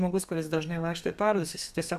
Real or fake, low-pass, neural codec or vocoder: fake; 14.4 kHz; codec, 32 kHz, 1.9 kbps, SNAC